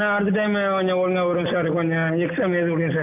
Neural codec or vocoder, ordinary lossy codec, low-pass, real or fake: none; none; 3.6 kHz; real